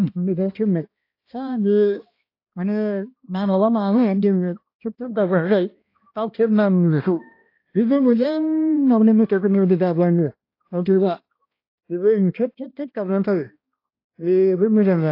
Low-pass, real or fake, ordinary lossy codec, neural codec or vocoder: 5.4 kHz; fake; AAC, 32 kbps; codec, 16 kHz, 1 kbps, X-Codec, HuBERT features, trained on balanced general audio